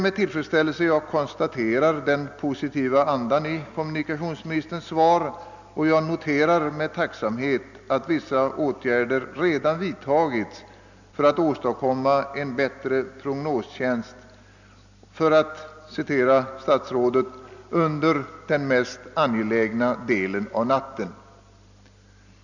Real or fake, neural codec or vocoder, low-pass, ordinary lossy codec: real; none; 7.2 kHz; none